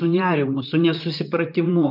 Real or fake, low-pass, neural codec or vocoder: fake; 5.4 kHz; vocoder, 44.1 kHz, 128 mel bands, Pupu-Vocoder